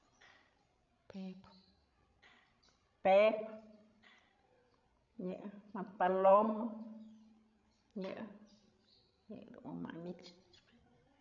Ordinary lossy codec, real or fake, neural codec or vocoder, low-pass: none; fake; codec, 16 kHz, 16 kbps, FreqCodec, larger model; 7.2 kHz